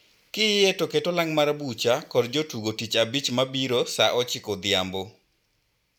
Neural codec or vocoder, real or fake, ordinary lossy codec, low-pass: none; real; none; 19.8 kHz